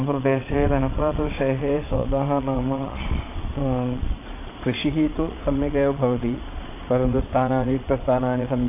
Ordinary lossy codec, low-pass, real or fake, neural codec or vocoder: AAC, 24 kbps; 3.6 kHz; fake; vocoder, 22.05 kHz, 80 mel bands, Vocos